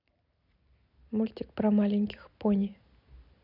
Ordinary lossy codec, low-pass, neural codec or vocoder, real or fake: none; 5.4 kHz; none; real